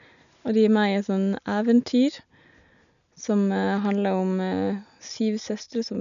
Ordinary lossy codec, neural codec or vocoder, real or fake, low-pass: none; none; real; 7.2 kHz